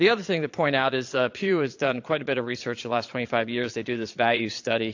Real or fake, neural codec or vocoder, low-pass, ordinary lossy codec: fake; vocoder, 22.05 kHz, 80 mel bands, Vocos; 7.2 kHz; AAC, 48 kbps